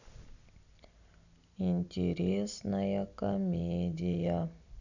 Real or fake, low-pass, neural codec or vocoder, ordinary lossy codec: real; 7.2 kHz; none; none